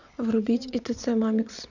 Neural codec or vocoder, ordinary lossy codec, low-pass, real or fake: vocoder, 22.05 kHz, 80 mel bands, WaveNeXt; none; 7.2 kHz; fake